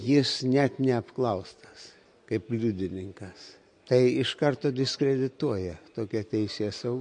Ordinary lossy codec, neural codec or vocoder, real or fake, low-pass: MP3, 48 kbps; none; real; 9.9 kHz